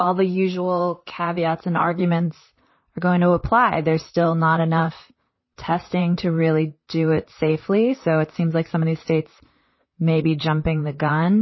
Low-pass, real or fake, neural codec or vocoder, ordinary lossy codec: 7.2 kHz; fake; vocoder, 44.1 kHz, 128 mel bands, Pupu-Vocoder; MP3, 24 kbps